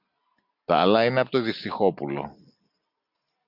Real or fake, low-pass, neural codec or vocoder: real; 5.4 kHz; none